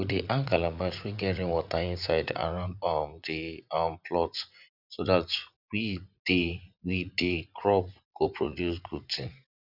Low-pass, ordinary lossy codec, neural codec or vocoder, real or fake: 5.4 kHz; none; vocoder, 44.1 kHz, 80 mel bands, Vocos; fake